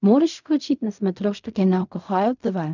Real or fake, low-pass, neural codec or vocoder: fake; 7.2 kHz; codec, 16 kHz in and 24 kHz out, 0.4 kbps, LongCat-Audio-Codec, fine tuned four codebook decoder